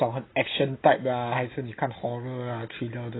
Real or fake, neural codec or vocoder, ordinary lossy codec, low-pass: real; none; AAC, 16 kbps; 7.2 kHz